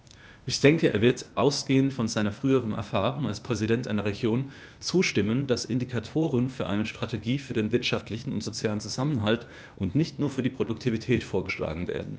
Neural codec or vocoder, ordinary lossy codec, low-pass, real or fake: codec, 16 kHz, 0.8 kbps, ZipCodec; none; none; fake